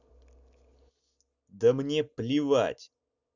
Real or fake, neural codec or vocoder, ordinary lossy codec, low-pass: real; none; none; 7.2 kHz